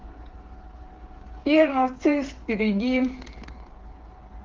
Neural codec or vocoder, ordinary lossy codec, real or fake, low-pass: codec, 16 kHz, 8 kbps, FreqCodec, smaller model; Opus, 16 kbps; fake; 7.2 kHz